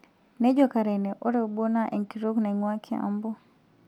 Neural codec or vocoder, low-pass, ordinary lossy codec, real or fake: none; 19.8 kHz; none; real